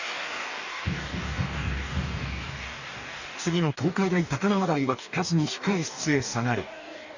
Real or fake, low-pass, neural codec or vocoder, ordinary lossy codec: fake; 7.2 kHz; codec, 44.1 kHz, 2.6 kbps, DAC; none